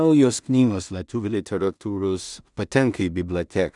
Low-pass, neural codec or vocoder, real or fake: 10.8 kHz; codec, 16 kHz in and 24 kHz out, 0.4 kbps, LongCat-Audio-Codec, two codebook decoder; fake